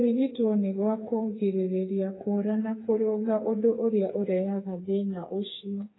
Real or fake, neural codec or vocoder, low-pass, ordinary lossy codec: fake; codec, 16 kHz, 4 kbps, FreqCodec, smaller model; 7.2 kHz; AAC, 16 kbps